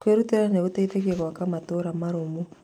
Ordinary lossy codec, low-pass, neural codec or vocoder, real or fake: none; 19.8 kHz; none; real